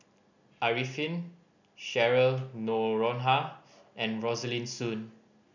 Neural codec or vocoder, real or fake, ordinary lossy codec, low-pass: none; real; none; 7.2 kHz